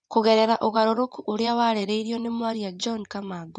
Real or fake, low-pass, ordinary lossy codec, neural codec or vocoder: fake; 9.9 kHz; none; vocoder, 24 kHz, 100 mel bands, Vocos